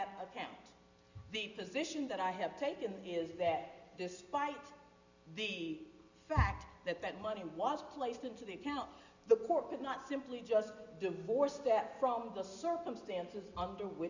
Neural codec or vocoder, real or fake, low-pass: none; real; 7.2 kHz